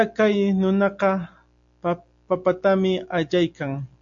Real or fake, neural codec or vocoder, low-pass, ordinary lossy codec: real; none; 7.2 kHz; AAC, 64 kbps